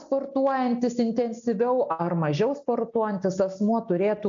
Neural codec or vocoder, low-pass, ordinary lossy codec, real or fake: none; 7.2 kHz; AAC, 64 kbps; real